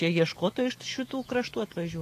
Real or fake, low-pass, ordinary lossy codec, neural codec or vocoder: fake; 14.4 kHz; AAC, 48 kbps; autoencoder, 48 kHz, 128 numbers a frame, DAC-VAE, trained on Japanese speech